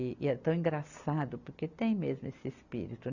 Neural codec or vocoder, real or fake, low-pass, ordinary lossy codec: none; real; 7.2 kHz; none